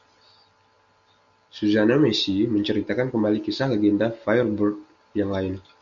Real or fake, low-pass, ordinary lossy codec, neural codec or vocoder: real; 7.2 kHz; Opus, 64 kbps; none